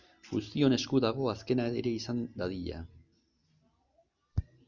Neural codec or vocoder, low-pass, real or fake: none; 7.2 kHz; real